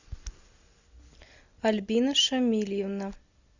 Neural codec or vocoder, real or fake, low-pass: none; real; 7.2 kHz